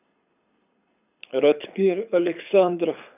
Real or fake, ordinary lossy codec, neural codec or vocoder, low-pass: fake; none; codec, 24 kHz, 6 kbps, HILCodec; 3.6 kHz